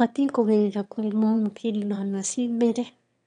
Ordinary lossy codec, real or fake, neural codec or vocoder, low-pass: none; fake; autoencoder, 22.05 kHz, a latent of 192 numbers a frame, VITS, trained on one speaker; 9.9 kHz